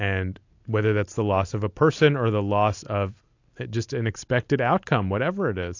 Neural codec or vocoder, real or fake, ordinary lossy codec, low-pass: none; real; AAC, 48 kbps; 7.2 kHz